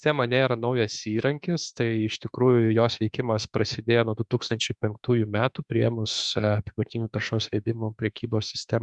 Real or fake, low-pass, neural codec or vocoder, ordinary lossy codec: fake; 10.8 kHz; autoencoder, 48 kHz, 32 numbers a frame, DAC-VAE, trained on Japanese speech; Opus, 64 kbps